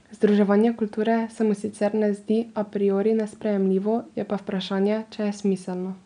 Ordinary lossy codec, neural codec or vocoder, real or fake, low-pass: none; none; real; 9.9 kHz